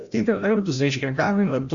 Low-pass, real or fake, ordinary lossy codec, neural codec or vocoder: 7.2 kHz; fake; Opus, 64 kbps; codec, 16 kHz, 0.5 kbps, FreqCodec, larger model